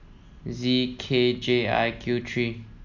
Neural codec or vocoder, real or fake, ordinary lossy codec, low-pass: none; real; none; 7.2 kHz